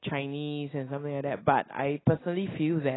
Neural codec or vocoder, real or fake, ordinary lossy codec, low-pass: none; real; AAC, 16 kbps; 7.2 kHz